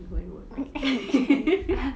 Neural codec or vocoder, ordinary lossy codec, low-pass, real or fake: none; none; none; real